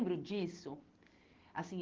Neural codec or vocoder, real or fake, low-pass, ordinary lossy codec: none; real; 7.2 kHz; Opus, 16 kbps